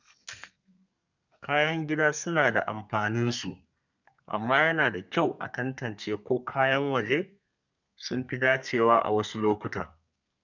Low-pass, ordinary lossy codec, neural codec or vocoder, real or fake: 7.2 kHz; none; codec, 32 kHz, 1.9 kbps, SNAC; fake